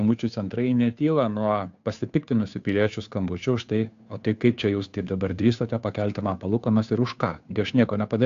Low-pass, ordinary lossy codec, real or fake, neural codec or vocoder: 7.2 kHz; AAC, 64 kbps; fake; codec, 16 kHz, 2 kbps, FunCodec, trained on Chinese and English, 25 frames a second